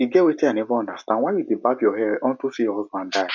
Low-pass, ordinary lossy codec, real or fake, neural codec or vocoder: 7.2 kHz; none; real; none